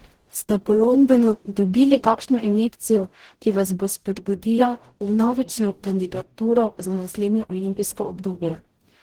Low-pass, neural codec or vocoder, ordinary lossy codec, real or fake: 19.8 kHz; codec, 44.1 kHz, 0.9 kbps, DAC; Opus, 16 kbps; fake